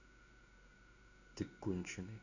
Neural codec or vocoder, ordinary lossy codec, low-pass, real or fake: codec, 16 kHz in and 24 kHz out, 1 kbps, XY-Tokenizer; none; 7.2 kHz; fake